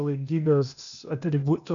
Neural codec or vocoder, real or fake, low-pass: codec, 16 kHz, 0.8 kbps, ZipCodec; fake; 7.2 kHz